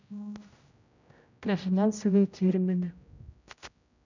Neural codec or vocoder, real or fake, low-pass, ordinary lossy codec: codec, 16 kHz, 0.5 kbps, X-Codec, HuBERT features, trained on general audio; fake; 7.2 kHz; none